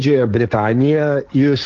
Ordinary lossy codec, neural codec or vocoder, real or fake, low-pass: Opus, 32 kbps; codec, 16 kHz, 1.1 kbps, Voila-Tokenizer; fake; 7.2 kHz